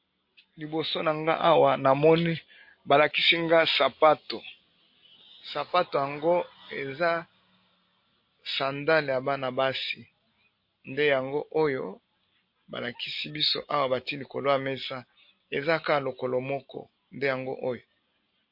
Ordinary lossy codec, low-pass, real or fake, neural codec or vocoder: MP3, 32 kbps; 5.4 kHz; real; none